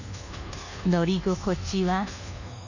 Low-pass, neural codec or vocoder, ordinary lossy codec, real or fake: 7.2 kHz; codec, 24 kHz, 1.2 kbps, DualCodec; none; fake